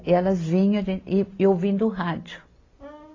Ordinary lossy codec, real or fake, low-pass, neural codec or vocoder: AAC, 32 kbps; real; 7.2 kHz; none